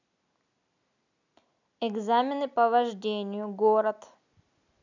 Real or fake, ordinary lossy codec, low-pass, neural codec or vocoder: real; none; 7.2 kHz; none